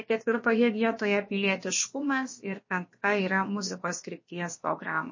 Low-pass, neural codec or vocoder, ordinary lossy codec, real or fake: 7.2 kHz; codec, 16 kHz, about 1 kbps, DyCAST, with the encoder's durations; MP3, 32 kbps; fake